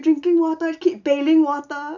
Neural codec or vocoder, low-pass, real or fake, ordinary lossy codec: none; 7.2 kHz; real; none